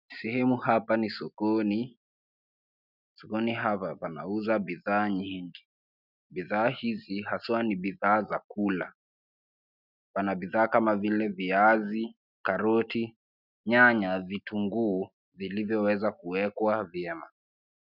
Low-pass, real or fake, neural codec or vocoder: 5.4 kHz; real; none